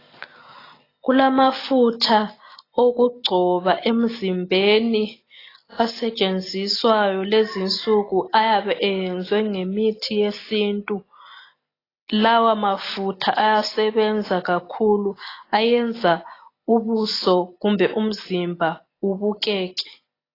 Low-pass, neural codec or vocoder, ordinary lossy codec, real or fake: 5.4 kHz; none; AAC, 24 kbps; real